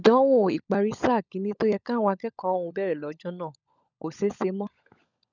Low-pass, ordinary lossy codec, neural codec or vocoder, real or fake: 7.2 kHz; none; codec, 16 kHz, 16 kbps, FunCodec, trained on LibriTTS, 50 frames a second; fake